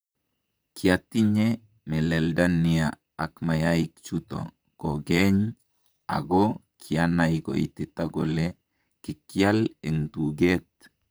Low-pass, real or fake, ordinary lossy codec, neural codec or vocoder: none; fake; none; vocoder, 44.1 kHz, 128 mel bands, Pupu-Vocoder